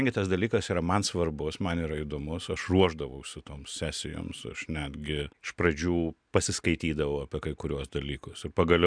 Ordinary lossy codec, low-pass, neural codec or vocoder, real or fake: MP3, 96 kbps; 9.9 kHz; none; real